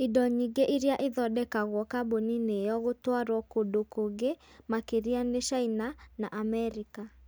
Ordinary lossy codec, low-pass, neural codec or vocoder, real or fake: none; none; none; real